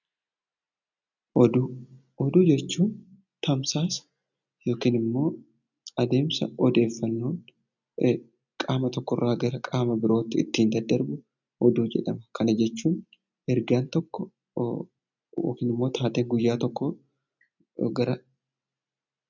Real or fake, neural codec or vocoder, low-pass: real; none; 7.2 kHz